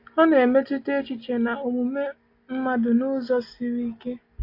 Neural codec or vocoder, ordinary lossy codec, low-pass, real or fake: none; AAC, 32 kbps; 5.4 kHz; real